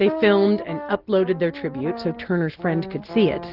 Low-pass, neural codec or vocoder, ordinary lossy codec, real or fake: 5.4 kHz; none; Opus, 16 kbps; real